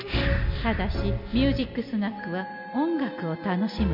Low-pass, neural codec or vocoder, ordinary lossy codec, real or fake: 5.4 kHz; none; MP3, 48 kbps; real